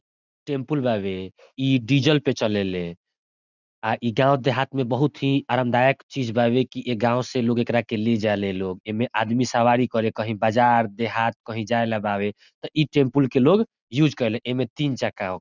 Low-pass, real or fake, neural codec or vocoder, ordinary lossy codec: 7.2 kHz; real; none; none